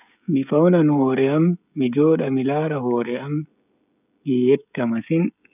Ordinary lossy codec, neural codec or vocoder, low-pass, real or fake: none; codec, 16 kHz, 16 kbps, FreqCodec, smaller model; 3.6 kHz; fake